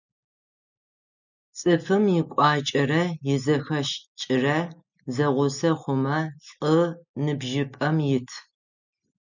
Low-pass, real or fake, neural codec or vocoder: 7.2 kHz; real; none